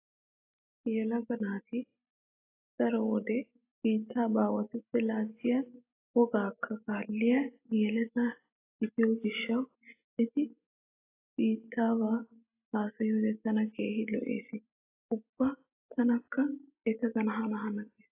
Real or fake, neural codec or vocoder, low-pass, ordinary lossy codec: real; none; 3.6 kHz; AAC, 24 kbps